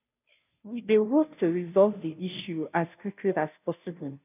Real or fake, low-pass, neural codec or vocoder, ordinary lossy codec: fake; 3.6 kHz; codec, 16 kHz, 0.5 kbps, FunCodec, trained on Chinese and English, 25 frames a second; none